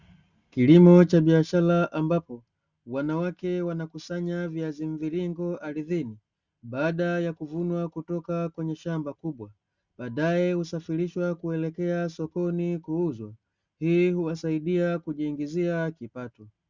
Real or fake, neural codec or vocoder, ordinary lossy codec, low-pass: real; none; Opus, 64 kbps; 7.2 kHz